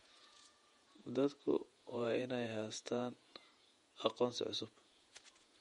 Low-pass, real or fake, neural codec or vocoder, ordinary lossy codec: 19.8 kHz; fake; vocoder, 48 kHz, 128 mel bands, Vocos; MP3, 48 kbps